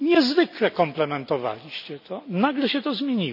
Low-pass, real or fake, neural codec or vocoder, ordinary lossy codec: 5.4 kHz; real; none; none